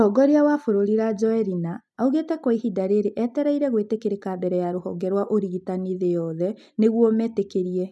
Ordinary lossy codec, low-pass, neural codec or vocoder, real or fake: none; none; none; real